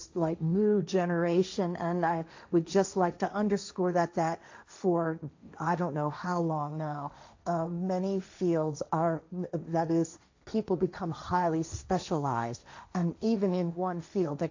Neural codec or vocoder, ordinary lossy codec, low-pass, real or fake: codec, 16 kHz, 1.1 kbps, Voila-Tokenizer; AAC, 48 kbps; 7.2 kHz; fake